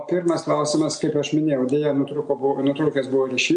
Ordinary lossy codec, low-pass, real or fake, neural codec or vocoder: MP3, 64 kbps; 9.9 kHz; real; none